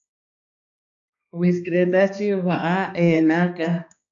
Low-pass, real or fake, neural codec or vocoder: 7.2 kHz; fake; codec, 16 kHz, 2 kbps, X-Codec, HuBERT features, trained on balanced general audio